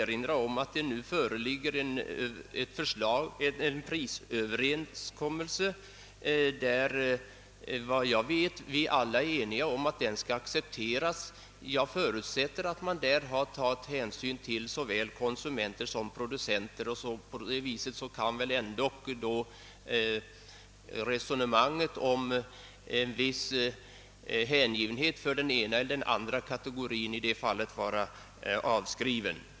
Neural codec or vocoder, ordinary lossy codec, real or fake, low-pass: none; none; real; none